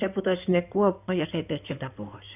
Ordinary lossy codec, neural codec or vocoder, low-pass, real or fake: none; codec, 16 kHz in and 24 kHz out, 2.2 kbps, FireRedTTS-2 codec; 3.6 kHz; fake